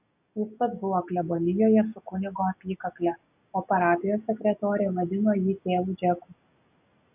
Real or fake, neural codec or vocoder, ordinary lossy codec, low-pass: real; none; AAC, 32 kbps; 3.6 kHz